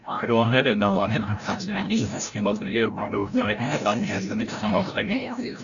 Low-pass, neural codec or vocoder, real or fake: 7.2 kHz; codec, 16 kHz, 0.5 kbps, FreqCodec, larger model; fake